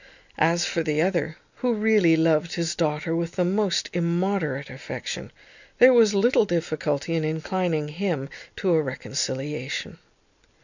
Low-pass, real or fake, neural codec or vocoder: 7.2 kHz; real; none